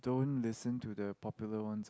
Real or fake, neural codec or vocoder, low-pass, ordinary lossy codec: real; none; none; none